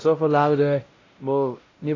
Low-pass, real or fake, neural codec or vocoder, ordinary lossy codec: 7.2 kHz; fake; codec, 16 kHz, 0.5 kbps, X-Codec, WavLM features, trained on Multilingual LibriSpeech; AAC, 32 kbps